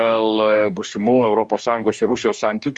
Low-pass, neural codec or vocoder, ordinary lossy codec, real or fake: 10.8 kHz; codec, 44.1 kHz, 2.6 kbps, DAC; Opus, 64 kbps; fake